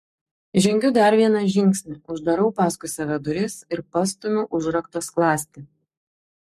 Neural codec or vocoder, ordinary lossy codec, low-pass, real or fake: codec, 44.1 kHz, 7.8 kbps, Pupu-Codec; MP3, 64 kbps; 14.4 kHz; fake